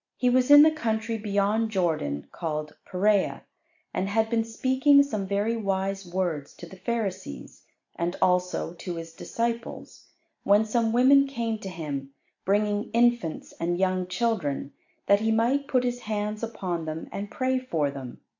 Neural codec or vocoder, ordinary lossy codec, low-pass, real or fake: none; AAC, 48 kbps; 7.2 kHz; real